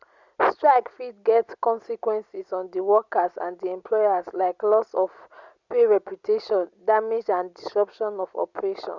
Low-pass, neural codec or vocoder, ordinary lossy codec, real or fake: 7.2 kHz; none; Opus, 64 kbps; real